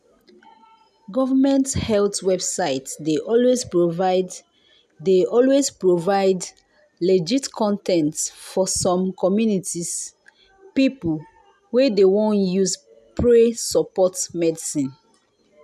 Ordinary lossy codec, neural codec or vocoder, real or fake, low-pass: none; none; real; 14.4 kHz